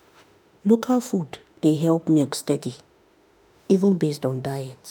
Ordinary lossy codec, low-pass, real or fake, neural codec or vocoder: none; none; fake; autoencoder, 48 kHz, 32 numbers a frame, DAC-VAE, trained on Japanese speech